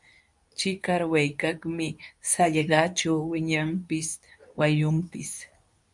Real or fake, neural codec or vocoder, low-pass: fake; codec, 24 kHz, 0.9 kbps, WavTokenizer, medium speech release version 2; 10.8 kHz